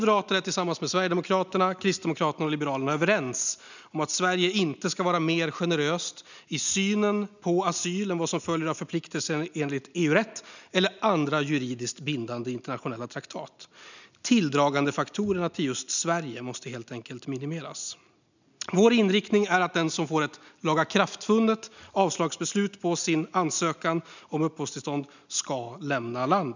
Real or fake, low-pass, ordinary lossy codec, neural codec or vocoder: real; 7.2 kHz; none; none